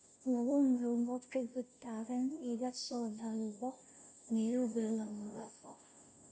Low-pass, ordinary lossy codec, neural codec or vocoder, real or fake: none; none; codec, 16 kHz, 0.5 kbps, FunCodec, trained on Chinese and English, 25 frames a second; fake